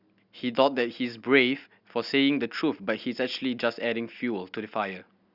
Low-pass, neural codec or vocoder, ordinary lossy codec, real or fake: 5.4 kHz; none; Opus, 64 kbps; real